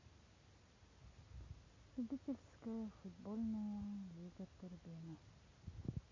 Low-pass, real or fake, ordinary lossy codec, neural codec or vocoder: 7.2 kHz; real; MP3, 32 kbps; none